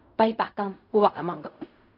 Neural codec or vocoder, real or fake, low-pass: codec, 16 kHz in and 24 kHz out, 0.4 kbps, LongCat-Audio-Codec, fine tuned four codebook decoder; fake; 5.4 kHz